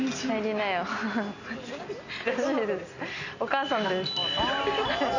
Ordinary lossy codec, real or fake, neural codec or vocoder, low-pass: none; real; none; 7.2 kHz